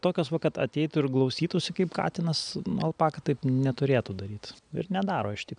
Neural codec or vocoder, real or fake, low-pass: none; real; 9.9 kHz